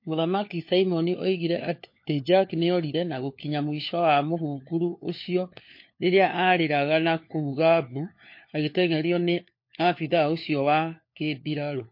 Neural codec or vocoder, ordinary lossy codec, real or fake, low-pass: codec, 16 kHz, 4 kbps, FunCodec, trained on LibriTTS, 50 frames a second; MP3, 32 kbps; fake; 5.4 kHz